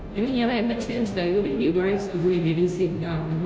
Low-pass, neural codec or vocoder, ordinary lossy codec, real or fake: none; codec, 16 kHz, 0.5 kbps, FunCodec, trained on Chinese and English, 25 frames a second; none; fake